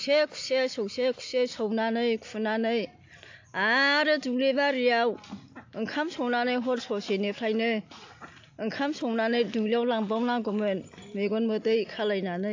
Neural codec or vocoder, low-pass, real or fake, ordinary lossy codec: none; 7.2 kHz; real; AAC, 48 kbps